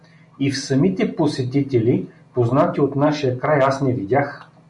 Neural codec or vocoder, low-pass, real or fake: none; 10.8 kHz; real